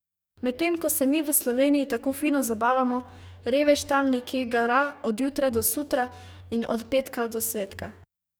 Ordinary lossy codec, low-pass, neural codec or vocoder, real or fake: none; none; codec, 44.1 kHz, 2.6 kbps, DAC; fake